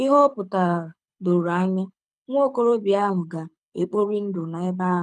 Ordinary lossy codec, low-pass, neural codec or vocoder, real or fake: none; none; codec, 24 kHz, 6 kbps, HILCodec; fake